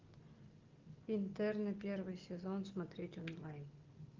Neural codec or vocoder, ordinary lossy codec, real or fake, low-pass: none; Opus, 24 kbps; real; 7.2 kHz